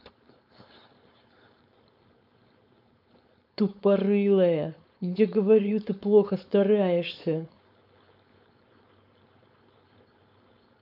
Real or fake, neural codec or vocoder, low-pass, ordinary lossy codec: fake; codec, 16 kHz, 4.8 kbps, FACodec; 5.4 kHz; none